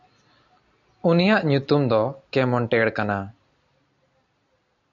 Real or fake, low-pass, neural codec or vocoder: real; 7.2 kHz; none